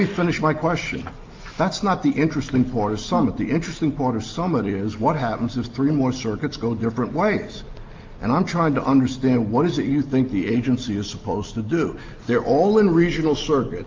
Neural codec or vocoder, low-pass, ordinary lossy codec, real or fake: none; 7.2 kHz; Opus, 24 kbps; real